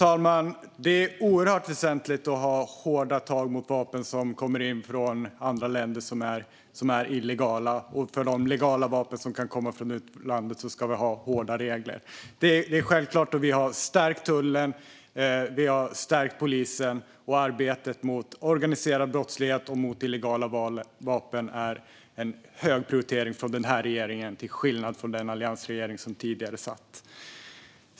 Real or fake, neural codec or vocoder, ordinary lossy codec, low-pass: real; none; none; none